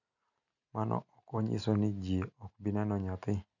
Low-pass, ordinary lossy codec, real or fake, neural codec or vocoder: 7.2 kHz; none; real; none